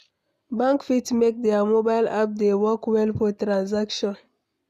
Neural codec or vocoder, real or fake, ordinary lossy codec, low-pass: none; real; none; 14.4 kHz